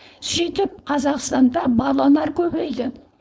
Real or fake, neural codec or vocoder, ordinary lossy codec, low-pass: fake; codec, 16 kHz, 4.8 kbps, FACodec; none; none